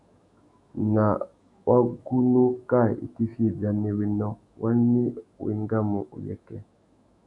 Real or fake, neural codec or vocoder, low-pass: fake; autoencoder, 48 kHz, 128 numbers a frame, DAC-VAE, trained on Japanese speech; 10.8 kHz